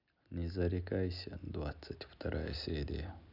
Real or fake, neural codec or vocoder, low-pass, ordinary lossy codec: real; none; 5.4 kHz; none